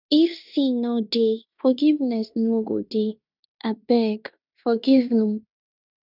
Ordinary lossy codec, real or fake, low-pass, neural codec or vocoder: none; fake; 5.4 kHz; codec, 16 kHz in and 24 kHz out, 0.9 kbps, LongCat-Audio-Codec, fine tuned four codebook decoder